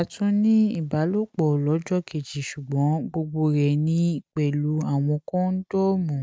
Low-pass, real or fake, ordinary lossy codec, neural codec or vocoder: none; real; none; none